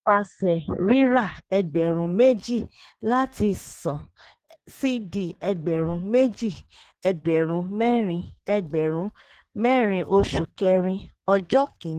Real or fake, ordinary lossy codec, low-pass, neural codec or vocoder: fake; Opus, 16 kbps; 14.4 kHz; codec, 32 kHz, 1.9 kbps, SNAC